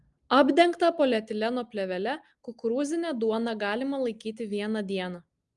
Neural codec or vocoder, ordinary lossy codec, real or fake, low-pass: none; Opus, 32 kbps; real; 10.8 kHz